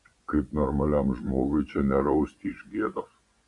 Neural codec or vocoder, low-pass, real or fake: vocoder, 24 kHz, 100 mel bands, Vocos; 10.8 kHz; fake